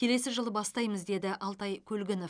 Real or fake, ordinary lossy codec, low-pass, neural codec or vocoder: real; none; 9.9 kHz; none